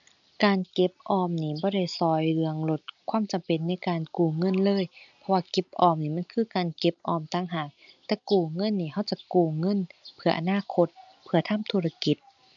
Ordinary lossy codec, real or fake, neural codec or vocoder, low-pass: none; real; none; 7.2 kHz